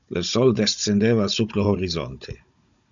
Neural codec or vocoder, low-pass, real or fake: codec, 16 kHz, 16 kbps, FunCodec, trained on Chinese and English, 50 frames a second; 7.2 kHz; fake